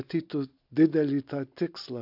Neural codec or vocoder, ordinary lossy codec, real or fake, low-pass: none; AAC, 48 kbps; real; 5.4 kHz